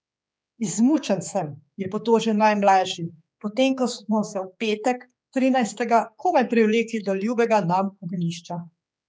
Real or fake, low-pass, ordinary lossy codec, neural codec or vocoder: fake; none; none; codec, 16 kHz, 4 kbps, X-Codec, HuBERT features, trained on general audio